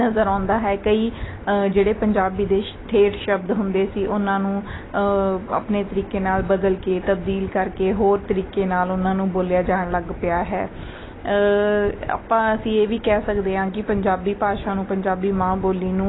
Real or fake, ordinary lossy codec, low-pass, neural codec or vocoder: real; AAC, 16 kbps; 7.2 kHz; none